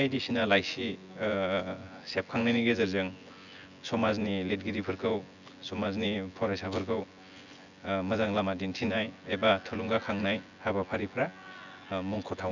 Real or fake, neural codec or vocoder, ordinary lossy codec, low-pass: fake; vocoder, 24 kHz, 100 mel bands, Vocos; none; 7.2 kHz